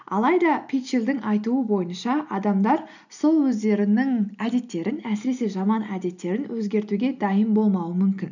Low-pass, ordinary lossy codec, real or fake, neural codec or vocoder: 7.2 kHz; none; real; none